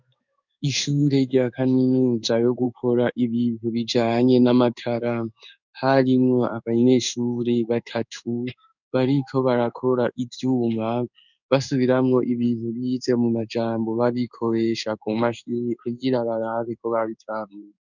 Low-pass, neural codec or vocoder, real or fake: 7.2 kHz; codec, 16 kHz in and 24 kHz out, 1 kbps, XY-Tokenizer; fake